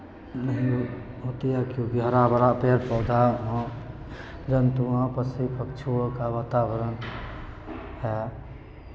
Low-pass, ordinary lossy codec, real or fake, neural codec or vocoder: none; none; real; none